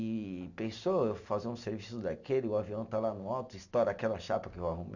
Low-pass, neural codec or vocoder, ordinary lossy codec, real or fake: 7.2 kHz; none; none; real